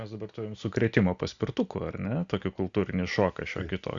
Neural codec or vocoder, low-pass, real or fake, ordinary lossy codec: none; 7.2 kHz; real; Opus, 64 kbps